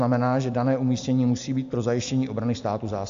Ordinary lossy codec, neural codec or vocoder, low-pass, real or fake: AAC, 64 kbps; none; 7.2 kHz; real